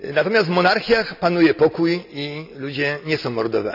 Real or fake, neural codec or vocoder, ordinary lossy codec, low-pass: real; none; none; 5.4 kHz